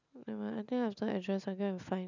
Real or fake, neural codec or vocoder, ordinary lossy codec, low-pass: real; none; none; 7.2 kHz